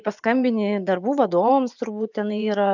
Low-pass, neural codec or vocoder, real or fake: 7.2 kHz; vocoder, 24 kHz, 100 mel bands, Vocos; fake